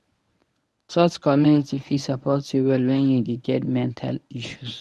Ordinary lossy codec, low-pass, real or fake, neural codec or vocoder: none; none; fake; codec, 24 kHz, 0.9 kbps, WavTokenizer, medium speech release version 1